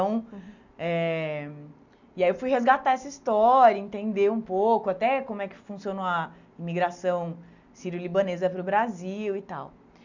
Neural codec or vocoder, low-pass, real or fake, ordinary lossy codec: none; 7.2 kHz; real; none